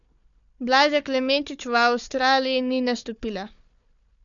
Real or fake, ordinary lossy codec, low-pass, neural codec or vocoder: fake; none; 7.2 kHz; codec, 16 kHz, 4 kbps, FunCodec, trained on Chinese and English, 50 frames a second